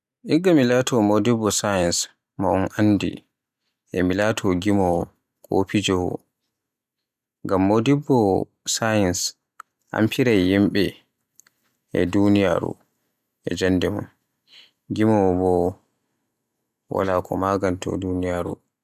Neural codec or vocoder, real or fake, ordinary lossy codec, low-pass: none; real; none; 14.4 kHz